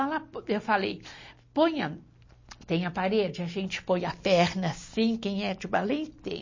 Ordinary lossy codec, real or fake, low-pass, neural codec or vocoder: MP3, 32 kbps; real; 7.2 kHz; none